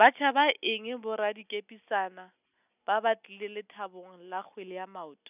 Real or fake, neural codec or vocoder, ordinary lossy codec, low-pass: real; none; none; 3.6 kHz